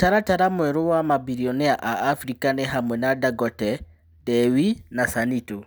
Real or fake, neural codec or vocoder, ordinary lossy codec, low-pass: real; none; none; none